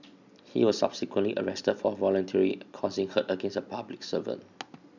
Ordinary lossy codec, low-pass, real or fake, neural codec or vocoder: none; 7.2 kHz; real; none